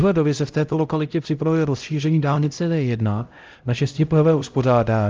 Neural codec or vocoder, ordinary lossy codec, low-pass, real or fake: codec, 16 kHz, 0.5 kbps, X-Codec, HuBERT features, trained on LibriSpeech; Opus, 32 kbps; 7.2 kHz; fake